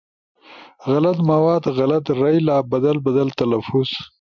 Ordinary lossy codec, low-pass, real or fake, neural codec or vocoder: MP3, 64 kbps; 7.2 kHz; real; none